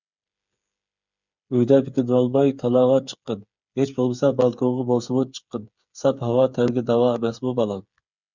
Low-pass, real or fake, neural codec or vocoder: 7.2 kHz; fake; codec, 16 kHz, 8 kbps, FreqCodec, smaller model